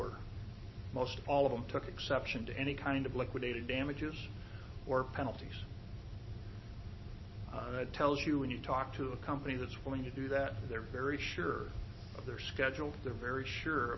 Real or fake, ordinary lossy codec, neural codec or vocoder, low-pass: real; MP3, 24 kbps; none; 7.2 kHz